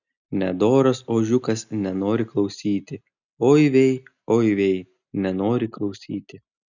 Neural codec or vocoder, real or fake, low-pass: none; real; 7.2 kHz